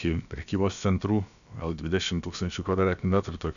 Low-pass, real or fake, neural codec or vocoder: 7.2 kHz; fake; codec, 16 kHz, about 1 kbps, DyCAST, with the encoder's durations